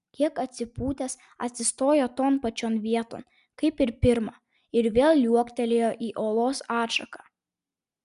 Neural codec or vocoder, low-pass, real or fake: none; 10.8 kHz; real